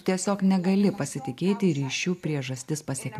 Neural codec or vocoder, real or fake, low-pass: none; real; 14.4 kHz